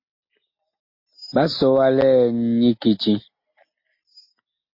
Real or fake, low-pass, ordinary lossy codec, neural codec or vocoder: real; 5.4 kHz; MP3, 32 kbps; none